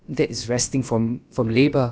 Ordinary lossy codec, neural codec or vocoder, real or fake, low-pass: none; codec, 16 kHz, about 1 kbps, DyCAST, with the encoder's durations; fake; none